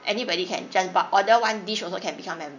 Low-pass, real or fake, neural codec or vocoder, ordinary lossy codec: 7.2 kHz; real; none; none